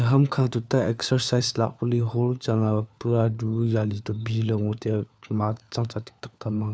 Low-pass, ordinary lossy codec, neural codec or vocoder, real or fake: none; none; codec, 16 kHz, 4 kbps, FunCodec, trained on LibriTTS, 50 frames a second; fake